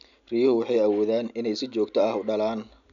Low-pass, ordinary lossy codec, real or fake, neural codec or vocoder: 7.2 kHz; none; real; none